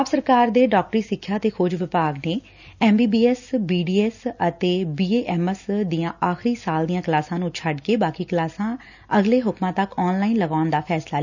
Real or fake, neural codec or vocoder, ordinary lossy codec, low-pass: real; none; none; 7.2 kHz